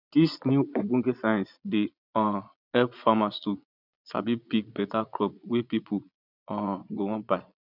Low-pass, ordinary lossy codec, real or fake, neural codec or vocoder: 5.4 kHz; none; fake; vocoder, 22.05 kHz, 80 mel bands, Vocos